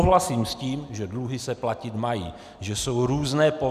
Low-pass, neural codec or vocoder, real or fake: 14.4 kHz; none; real